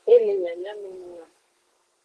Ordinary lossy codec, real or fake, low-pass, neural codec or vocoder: Opus, 16 kbps; fake; 10.8 kHz; codec, 32 kHz, 1.9 kbps, SNAC